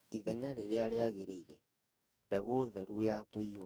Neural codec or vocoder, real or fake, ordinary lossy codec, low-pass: codec, 44.1 kHz, 2.6 kbps, DAC; fake; none; none